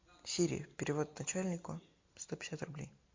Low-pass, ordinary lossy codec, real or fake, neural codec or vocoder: 7.2 kHz; MP3, 48 kbps; real; none